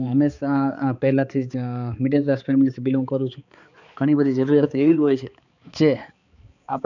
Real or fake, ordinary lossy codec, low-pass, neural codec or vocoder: fake; none; 7.2 kHz; codec, 16 kHz, 4 kbps, X-Codec, HuBERT features, trained on balanced general audio